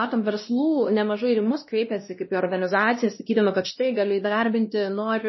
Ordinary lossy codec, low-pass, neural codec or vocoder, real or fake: MP3, 24 kbps; 7.2 kHz; codec, 16 kHz, 1 kbps, X-Codec, WavLM features, trained on Multilingual LibriSpeech; fake